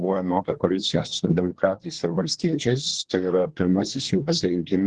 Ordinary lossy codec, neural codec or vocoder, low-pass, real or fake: Opus, 16 kbps; codec, 24 kHz, 1 kbps, SNAC; 10.8 kHz; fake